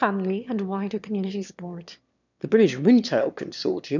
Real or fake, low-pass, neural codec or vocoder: fake; 7.2 kHz; autoencoder, 22.05 kHz, a latent of 192 numbers a frame, VITS, trained on one speaker